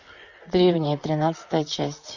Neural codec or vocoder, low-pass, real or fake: vocoder, 44.1 kHz, 128 mel bands, Pupu-Vocoder; 7.2 kHz; fake